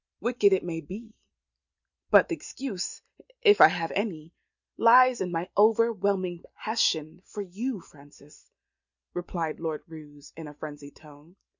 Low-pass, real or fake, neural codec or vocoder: 7.2 kHz; real; none